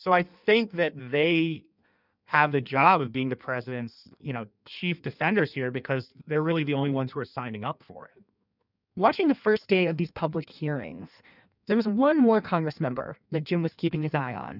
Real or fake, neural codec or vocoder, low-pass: fake; codec, 16 kHz in and 24 kHz out, 1.1 kbps, FireRedTTS-2 codec; 5.4 kHz